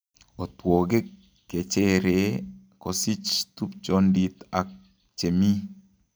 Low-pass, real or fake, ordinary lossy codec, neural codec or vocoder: none; real; none; none